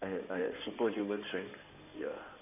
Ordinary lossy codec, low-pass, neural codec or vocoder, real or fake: none; 3.6 kHz; codec, 16 kHz in and 24 kHz out, 2.2 kbps, FireRedTTS-2 codec; fake